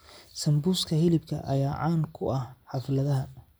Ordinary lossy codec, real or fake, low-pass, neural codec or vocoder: none; real; none; none